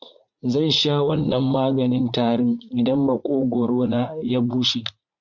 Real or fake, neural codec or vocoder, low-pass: fake; vocoder, 44.1 kHz, 80 mel bands, Vocos; 7.2 kHz